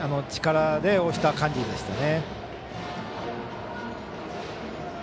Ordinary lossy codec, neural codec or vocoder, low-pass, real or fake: none; none; none; real